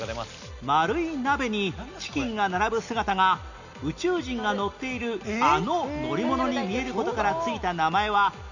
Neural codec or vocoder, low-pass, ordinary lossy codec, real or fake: none; 7.2 kHz; none; real